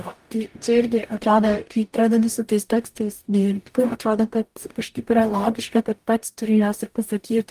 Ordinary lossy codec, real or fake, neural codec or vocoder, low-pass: Opus, 32 kbps; fake; codec, 44.1 kHz, 0.9 kbps, DAC; 14.4 kHz